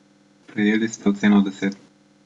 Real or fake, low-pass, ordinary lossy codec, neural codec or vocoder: real; 10.8 kHz; Opus, 64 kbps; none